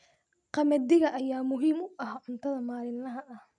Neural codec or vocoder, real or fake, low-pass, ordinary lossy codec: none; real; 9.9 kHz; none